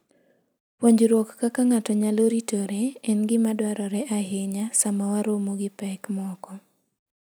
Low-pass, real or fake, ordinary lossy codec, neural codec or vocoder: none; real; none; none